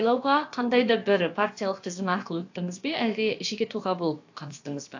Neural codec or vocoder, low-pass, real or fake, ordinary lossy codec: codec, 16 kHz, about 1 kbps, DyCAST, with the encoder's durations; 7.2 kHz; fake; AAC, 48 kbps